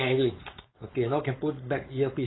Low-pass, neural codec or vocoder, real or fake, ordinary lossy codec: 7.2 kHz; codec, 16 kHz, 4.8 kbps, FACodec; fake; AAC, 16 kbps